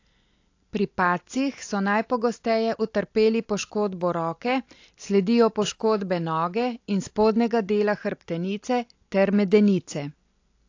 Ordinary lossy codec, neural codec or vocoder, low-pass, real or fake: AAC, 48 kbps; none; 7.2 kHz; real